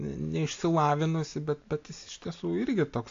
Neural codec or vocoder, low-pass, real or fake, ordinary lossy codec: none; 7.2 kHz; real; AAC, 48 kbps